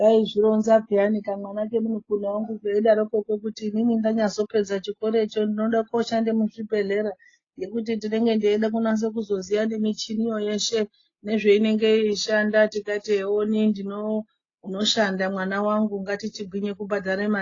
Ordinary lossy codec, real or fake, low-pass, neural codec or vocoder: AAC, 32 kbps; real; 7.2 kHz; none